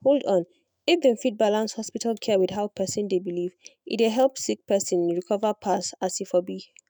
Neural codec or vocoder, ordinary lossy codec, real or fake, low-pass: autoencoder, 48 kHz, 128 numbers a frame, DAC-VAE, trained on Japanese speech; none; fake; none